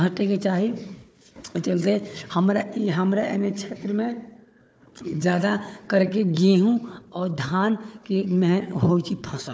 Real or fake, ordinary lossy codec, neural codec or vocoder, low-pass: fake; none; codec, 16 kHz, 4 kbps, FunCodec, trained on Chinese and English, 50 frames a second; none